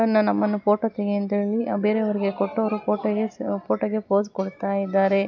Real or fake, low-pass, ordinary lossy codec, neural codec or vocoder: real; 7.2 kHz; none; none